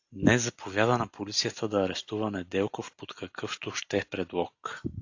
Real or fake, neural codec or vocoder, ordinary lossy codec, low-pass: real; none; AAC, 48 kbps; 7.2 kHz